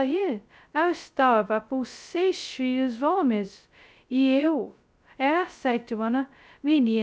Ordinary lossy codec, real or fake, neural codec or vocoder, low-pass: none; fake; codec, 16 kHz, 0.2 kbps, FocalCodec; none